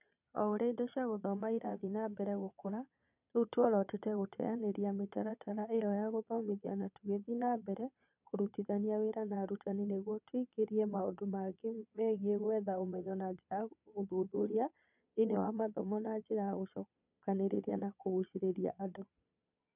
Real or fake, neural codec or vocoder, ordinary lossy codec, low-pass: fake; vocoder, 22.05 kHz, 80 mel bands, Vocos; none; 3.6 kHz